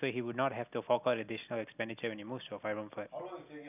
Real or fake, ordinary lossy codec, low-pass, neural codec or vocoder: real; none; 3.6 kHz; none